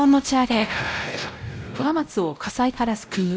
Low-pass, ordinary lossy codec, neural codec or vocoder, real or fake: none; none; codec, 16 kHz, 0.5 kbps, X-Codec, WavLM features, trained on Multilingual LibriSpeech; fake